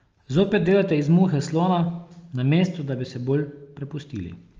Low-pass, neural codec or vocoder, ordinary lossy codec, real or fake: 7.2 kHz; none; Opus, 24 kbps; real